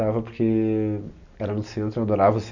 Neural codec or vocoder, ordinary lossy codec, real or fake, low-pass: none; none; real; 7.2 kHz